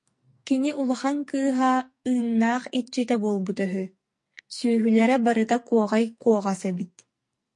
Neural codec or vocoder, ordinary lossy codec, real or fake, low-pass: codec, 44.1 kHz, 2.6 kbps, SNAC; MP3, 48 kbps; fake; 10.8 kHz